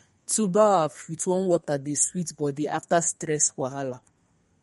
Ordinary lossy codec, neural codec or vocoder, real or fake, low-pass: MP3, 48 kbps; codec, 32 kHz, 1.9 kbps, SNAC; fake; 14.4 kHz